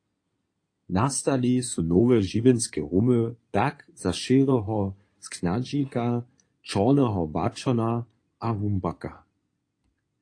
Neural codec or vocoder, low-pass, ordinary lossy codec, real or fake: codec, 16 kHz in and 24 kHz out, 2.2 kbps, FireRedTTS-2 codec; 9.9 kHz; AAC, 48 kbps; fake